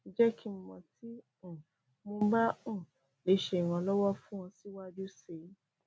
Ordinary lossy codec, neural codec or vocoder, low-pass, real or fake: none; none; none; real